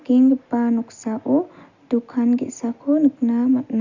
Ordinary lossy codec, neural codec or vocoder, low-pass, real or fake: Opus, 64 kbps; none; 7.2 kHz; real